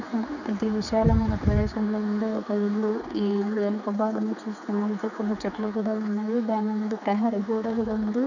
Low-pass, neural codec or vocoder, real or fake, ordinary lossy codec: 7.2 kHz; codec, 16 kHz, 4 kbps, X-Codec, HuBERT features, trained on general audio; fake; none